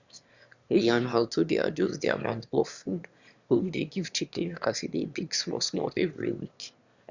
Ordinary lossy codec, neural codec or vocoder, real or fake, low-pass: Opus, 64 kbps; autoencoder, 22.05 kHz, a latent of 192 numbers a frame, VITS, trained on one speaker; fake; 7.2 kHz